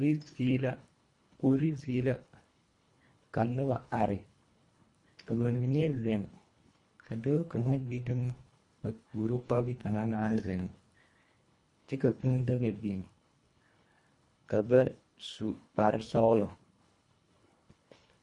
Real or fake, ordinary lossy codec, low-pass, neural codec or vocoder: fake; MP3, 48 kbps; 10.8 kHz; codec, 24 kHz, 1.5 kbps, HILCodec